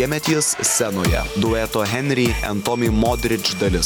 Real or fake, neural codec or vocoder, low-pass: real; none; 19.8 kHz